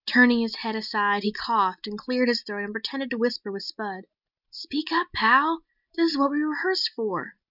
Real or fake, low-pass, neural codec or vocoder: real; 5.4 kHz; none